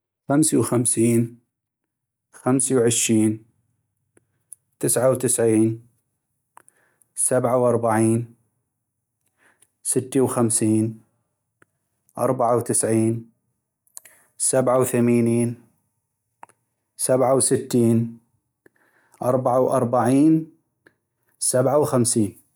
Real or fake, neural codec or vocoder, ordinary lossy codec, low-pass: real; none; none; none